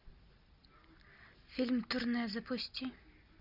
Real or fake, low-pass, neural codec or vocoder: real; 5.4 kHz; none